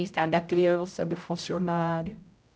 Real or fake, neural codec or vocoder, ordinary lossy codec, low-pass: fake; codec, 16 kHz, 0.5 kbps, X-Codec, HuBERT features, trained on general audio; none; none